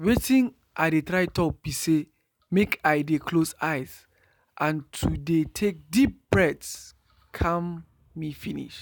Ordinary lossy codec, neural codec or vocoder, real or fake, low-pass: none; none; real; none